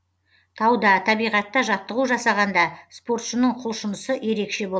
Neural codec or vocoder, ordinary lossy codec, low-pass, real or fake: none; none; none; real